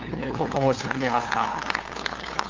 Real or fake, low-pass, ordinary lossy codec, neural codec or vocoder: fake; 7.2 kHz; Opus, 32 kbps; codec, 16 kHz, 2 kbps, FunCodec, trained on LibriTTS, 25 frames a second